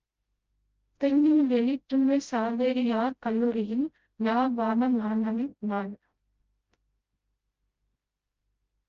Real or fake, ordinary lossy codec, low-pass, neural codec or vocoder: fake; Opus, 24 kbps; 7.2 kHz; codec, 16 kHz, 0.5 kbps, FreqCodec, smaller model